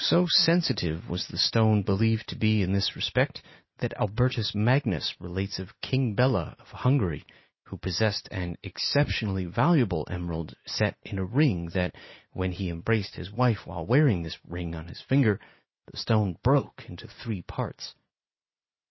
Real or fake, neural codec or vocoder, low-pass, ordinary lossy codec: real; none; 7.2 kHz; MP3, 24 kbps